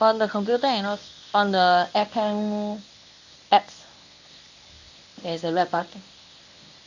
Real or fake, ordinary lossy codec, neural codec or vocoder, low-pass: fake; none; codec, 24 kHz, 0.9 kbps, WavTokenizer, medium speech release version 1; 7.2 kHz